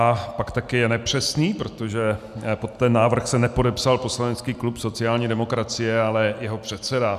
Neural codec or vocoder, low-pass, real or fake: none; 14.4 kHz; real